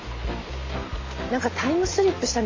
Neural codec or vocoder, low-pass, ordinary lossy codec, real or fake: none; 7.2 kHz; AAC, 32 kbps; real